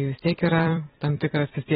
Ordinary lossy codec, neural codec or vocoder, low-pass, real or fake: AAC, 16 kbps; vocoder, 44.1 kHz, 128 mel bands every 256 samples, BigVGAN v2; 19.8 kHz; fake